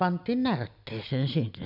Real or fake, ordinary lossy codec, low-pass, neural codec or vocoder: real; none; 5.4 kHz; none